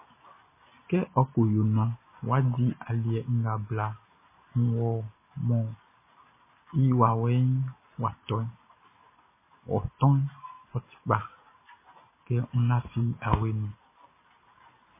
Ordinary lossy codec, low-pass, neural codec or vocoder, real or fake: MP3, 16 kbps; 3.6 kHz; none; real